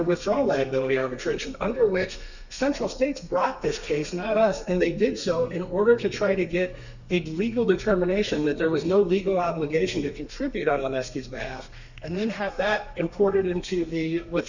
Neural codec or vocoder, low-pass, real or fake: codec, 32 kHz, 1.9 kbps, SNAC; 7.2 kHz; fake